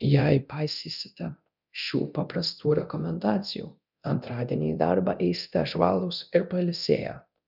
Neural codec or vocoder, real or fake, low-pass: codec, 24 kHz, 0.9 kbps, DualCodec; fake; 5.4 kHz